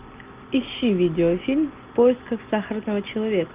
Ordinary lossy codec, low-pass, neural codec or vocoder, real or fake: Opus, 24 kbps; 3.6 kHz; none; real